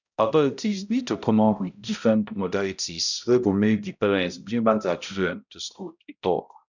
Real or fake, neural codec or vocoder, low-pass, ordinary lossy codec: fake; codec, 16 kHz, 0.5 kbps, X-Codec, HuBERT features, trained on balanced general audio; 7.2 kHz; none